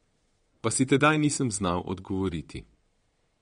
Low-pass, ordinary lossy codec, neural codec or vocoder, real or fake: 9.9 kHz; MP3, 48 kbps; vocoder, 22.05 kHz, 80 mel bands, Vocos; fake